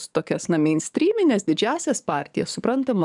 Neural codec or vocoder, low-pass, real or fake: codec, 44.1 kHz, 7.8 kbps, DAC; 10.8 kHz; fake